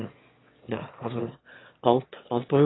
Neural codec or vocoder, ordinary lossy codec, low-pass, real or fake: autoencoder, 22.05 kHz, a latent of 192 numbers a frame, VITS, trained on one speaker; AAC, 16 kbps; 7.2 kHz; fake